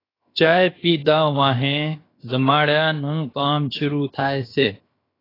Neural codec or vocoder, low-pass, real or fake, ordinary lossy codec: codec, 16 kHz, 0.7 kbps, FocalCodec; 5.4 kHz; fake; AAC, 24 kbps